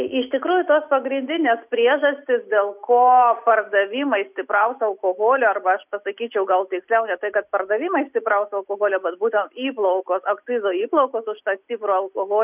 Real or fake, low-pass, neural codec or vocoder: real; 3.6 kHz; none